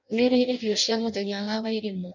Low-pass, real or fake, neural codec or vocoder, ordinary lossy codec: 7.2 kHz; fake; codec, 16 kHz in and 24 kHz out, 0.6 kbps, FireRedTTS-2 codec; none